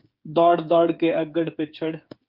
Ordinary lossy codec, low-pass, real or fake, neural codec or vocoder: Opus, 24 kbps; 5.4 kHz; fake; codec, 16 kHz, 8 kbps, FreqCodec, smaller model